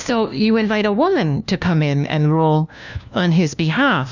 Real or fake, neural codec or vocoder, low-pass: fake; codec, 16 kHz, 1 kbps, FunCodec, trained on LibriTTS, 50 frames a second; 7.2 kHz